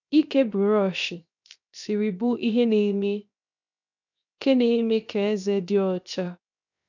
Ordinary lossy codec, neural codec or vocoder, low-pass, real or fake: none; codec, 16 kHz, 0.7 kbps, FocalCodec; 7.2 kHz; fake